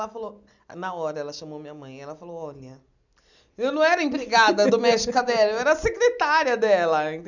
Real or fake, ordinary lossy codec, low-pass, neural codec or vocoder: real; none; 7.2 kHz; none